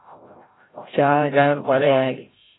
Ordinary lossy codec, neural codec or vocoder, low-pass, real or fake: AAC, 16 kbps; codec, 16 kHz, 0.5 kbps, FreqCodec, larger model; 7.2 kHz; fake